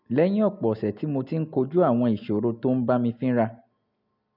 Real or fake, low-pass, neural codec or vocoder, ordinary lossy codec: real; 5.4 kHz; none; none